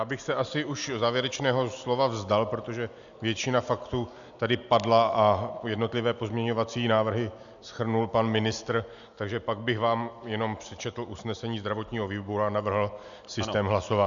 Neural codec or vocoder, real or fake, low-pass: none; real; 7.2 kHz